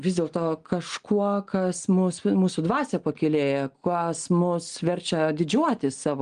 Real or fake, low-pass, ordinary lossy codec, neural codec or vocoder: real; 9.9 kHz; Opus, 24 kbps; none